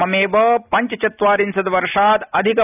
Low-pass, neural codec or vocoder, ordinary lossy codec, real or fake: 3.6 kHz; none; none; real